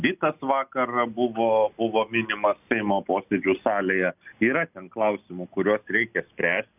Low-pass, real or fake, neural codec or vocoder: 3.6 kHz; real; none